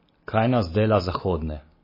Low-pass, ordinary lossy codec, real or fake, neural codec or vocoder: 5.4 kHz; MP3, 24 kbps; real; none